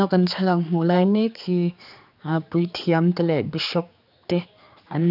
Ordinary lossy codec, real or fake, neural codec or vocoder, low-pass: none; fake; codec, 16 kHz, 4 kbps, X-Codec, HuBERT features, trained on general audio; 5.4 kHz